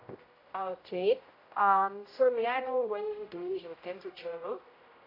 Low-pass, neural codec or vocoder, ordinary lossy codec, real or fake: 5.4 kHz; codec, 16 kHz, 0.5 kbps, X-Codec, HuBERT features, trained on general audio; AAC, 32 kbps; fake